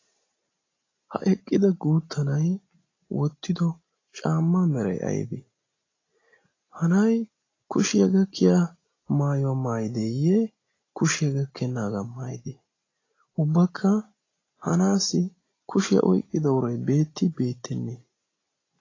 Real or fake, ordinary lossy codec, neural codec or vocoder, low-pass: real; AAC, 32 kbps; none; 7.2 kHz